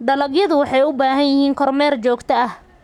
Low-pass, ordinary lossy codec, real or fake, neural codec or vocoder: 19.8 kHz; none; fake; codec, 44.1 kHz, 7.8 kbps, Pupu-Codec